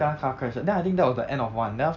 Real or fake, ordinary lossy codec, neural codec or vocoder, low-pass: real; none; none; 7.2 kHz